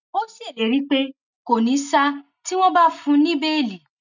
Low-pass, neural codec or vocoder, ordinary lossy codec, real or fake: 7.2 kHz; none; none; real